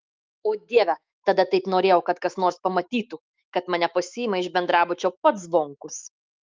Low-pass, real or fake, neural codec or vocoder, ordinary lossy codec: 7.2 kHz; fake; autoencoder, 48 kHz, 128 numbers a frame, DAC-VAE, trained on Japanese speech; Opus, 32 kbps